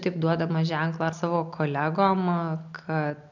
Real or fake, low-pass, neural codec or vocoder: real; 7.2 kHz; none